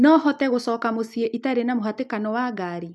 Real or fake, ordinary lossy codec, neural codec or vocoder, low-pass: real; none; none; none